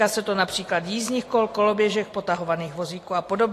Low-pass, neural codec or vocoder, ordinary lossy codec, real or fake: 14.4 kHz; none; AAC, 48 kbps; real